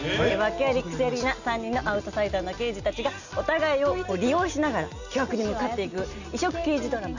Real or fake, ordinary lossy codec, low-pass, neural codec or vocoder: real; none; 7.2 kHz; none